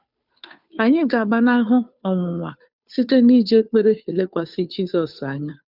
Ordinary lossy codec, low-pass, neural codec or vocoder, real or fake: none; 5.4 kHz; codec, 16 kHz, 2 kbps, FunCodec, trained on Chinese and English, 25 frames a second; fake